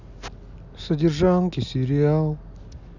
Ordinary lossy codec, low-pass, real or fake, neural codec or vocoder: none; 7.2 kHz; real; none